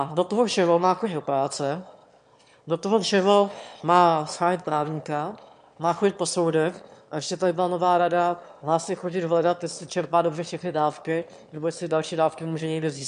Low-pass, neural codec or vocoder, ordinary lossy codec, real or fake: 9.9 kHz; autoencoder, 22.05 kHz, a latent of 192 numbers a frame, VITS, trained on one speaker; MP3, 64 kbps; fake